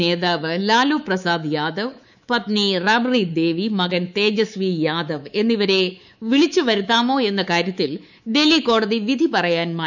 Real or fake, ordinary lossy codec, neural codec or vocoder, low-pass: fake; none; codec, 24 kHz, 3.1 kbps, DualCodec; 7.2 kHz